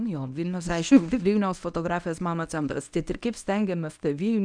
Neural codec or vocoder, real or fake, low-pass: codec, 24 kHz, 0.9 kbps, WavTokenizer, medium speech release version 1; fake; 9.9 kHz